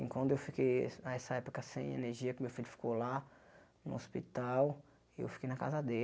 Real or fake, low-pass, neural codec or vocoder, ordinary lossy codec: real; none; none; none